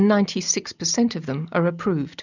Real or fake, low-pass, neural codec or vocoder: real; 7.2 kHz; none